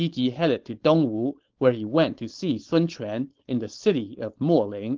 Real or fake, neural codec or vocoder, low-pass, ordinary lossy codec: fake; codec, 16 kHz, 4.8 kbps, FACodec; 7.2 kHz; Opus, 16 kbps